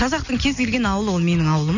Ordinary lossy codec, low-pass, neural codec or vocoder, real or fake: none; 7.2 kHz; none; real